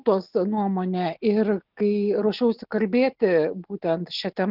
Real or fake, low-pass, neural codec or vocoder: real; 5.4 kHz; none